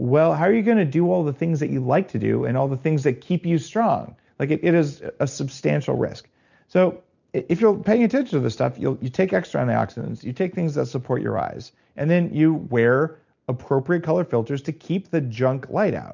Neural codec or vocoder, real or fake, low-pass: none; real; 7.2 kHz